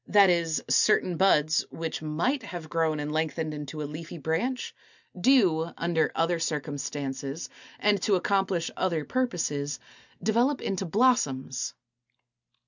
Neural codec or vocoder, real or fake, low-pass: none; real; 7.2 kHz